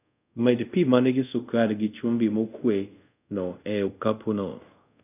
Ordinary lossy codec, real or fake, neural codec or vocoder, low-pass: none; fake; codec, 24 kHz, 0.5 kbps, DualCodec; 3.6 kHz